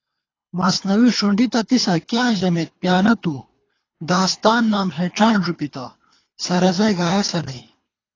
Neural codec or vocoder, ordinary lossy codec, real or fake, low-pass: codec, 24 kHz, 3 kbps, HILCodec; AAC, 32 kbps; fake; 7.2 kHz